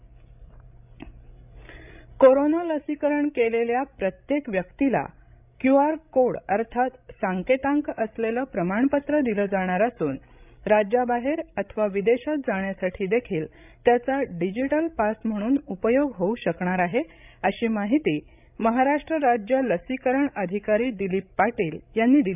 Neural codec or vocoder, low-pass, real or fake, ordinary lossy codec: codec, 16 kHz, 16 kbps, FreqCodec, larger model; 3.6 kHz; fake; none